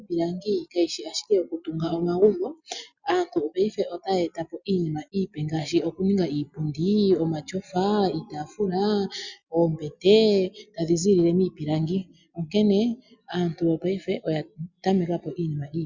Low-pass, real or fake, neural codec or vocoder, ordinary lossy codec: 7.2 kHz; real; none; Opus, 64 kbps